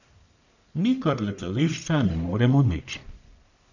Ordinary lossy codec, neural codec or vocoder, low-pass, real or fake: none; codec, 44.1 kHz, 1.7 kbps, Pupu-Codec; 7.2 kHz; fake